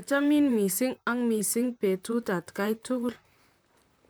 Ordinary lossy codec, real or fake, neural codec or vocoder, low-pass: none; fake; vocoder, 44.1 kHz, 128 mel bands, Pupu-Vocoder; none